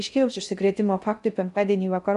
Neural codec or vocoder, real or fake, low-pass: codec, 16 kHz in and 24 kHz out, 0.6 kbps, FocalCodec, streaming, 2048 codes; fake; 10.8 kHz